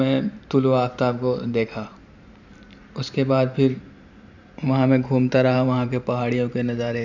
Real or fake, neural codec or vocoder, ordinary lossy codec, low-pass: fake; vocoder, 44.1 kHz, 128 mel bands every 512 samples, BigVGAN v2; none; 7.2 kHz